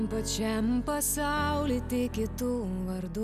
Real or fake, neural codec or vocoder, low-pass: real; none; 14.4 kHz